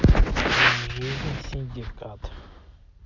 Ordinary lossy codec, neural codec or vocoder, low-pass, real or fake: none; none; 7.2 kHz; real